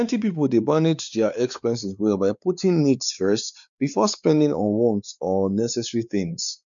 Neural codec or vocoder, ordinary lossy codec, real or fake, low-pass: codec, 16 kHz, 4 kbps, X-Codec, WavLM features, trained on Multilingual LibriSpeech; none; fake; 7.2 kHz